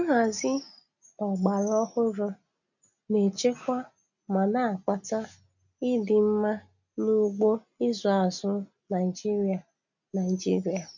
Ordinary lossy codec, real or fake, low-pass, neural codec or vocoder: none; real; 7.2 kHz; none